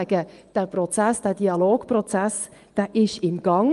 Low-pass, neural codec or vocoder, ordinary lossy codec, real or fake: 10.8 kHz; vocoder, 24 kHz, 100 mel bands, Vocos; Opus, 32 kbps; fake